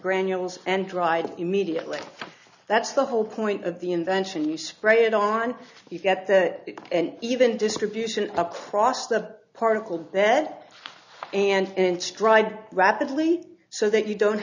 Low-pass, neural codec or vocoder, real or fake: 7.2 kHz; none; real